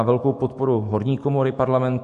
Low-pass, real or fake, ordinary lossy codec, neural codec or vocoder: 14.4 kHz; fake; MP3, 48 kbps; autoencoder, 48 kHz, 128 numbers a frame, DAC-VAE, trained on Japanese speech